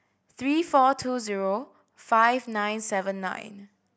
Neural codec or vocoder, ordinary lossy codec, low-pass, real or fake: none; none; none; real